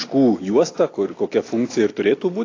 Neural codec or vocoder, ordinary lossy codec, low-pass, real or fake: none; AAC, 32 kbps; 7.2 kHz; real